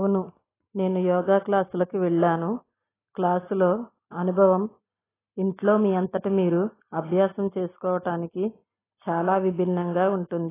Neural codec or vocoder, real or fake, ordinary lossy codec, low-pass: none; real; AAC, 16 kbps; 3.6 kHz